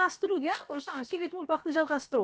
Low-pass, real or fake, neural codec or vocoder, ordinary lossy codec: none; fake; codec, 16 kHz, about 1 kbps, DyCAST, with the encoder's durations; none